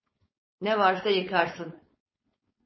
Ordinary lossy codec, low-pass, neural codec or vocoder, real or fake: MP3, 24 kbps; 7.2 kHz; codec, 16 kHz, 4.8 kbps, FACodec; fake